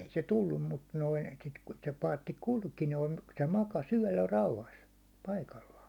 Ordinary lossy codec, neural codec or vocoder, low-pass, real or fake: none; none; 19.8 kHz; real